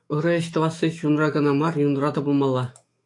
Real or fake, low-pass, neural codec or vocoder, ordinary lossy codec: fake; 10.8 kHz; autoencoder, 48 kHz, 128 numbers a frame, DAC-VAE, trained on Japanese speech; AAC, 48 kbps